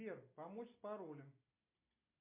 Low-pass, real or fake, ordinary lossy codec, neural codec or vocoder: 3.6 kHz; real; MP3, 24 kbps; none